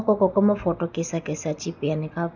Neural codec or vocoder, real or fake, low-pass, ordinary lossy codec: none; real; 7.2 kHz; none